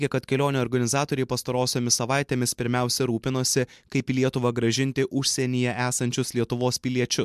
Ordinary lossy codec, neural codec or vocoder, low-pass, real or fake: MP3, 96 kbps; none; 14.4 kHz; real